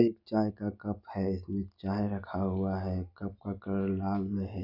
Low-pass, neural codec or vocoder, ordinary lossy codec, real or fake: 5.4 kHz; none; none; real